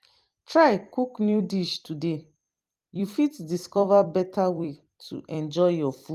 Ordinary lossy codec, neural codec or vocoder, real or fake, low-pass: Opus, 32 kbps; vocoder, 44.1 kHz, 128 mel bands every 256 samples, BigVGAN v2; fake; 14.4 kHz